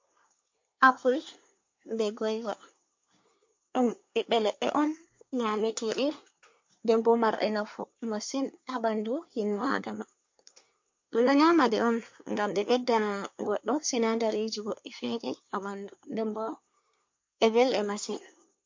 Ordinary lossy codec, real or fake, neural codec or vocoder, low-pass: MP3, 48 kbps; fake; codec, 24 kHz, 1 kbps, SNAC; 7.2 kHz